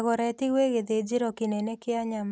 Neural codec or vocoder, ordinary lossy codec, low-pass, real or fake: none; none; none; real